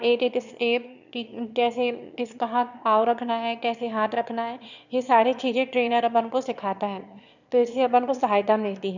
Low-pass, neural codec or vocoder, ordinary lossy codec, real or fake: 7.2 kHz; autoencoder, 22.05 kHz, a latent of 192 numbers a frame, VITS, trained on one speaker; none; fake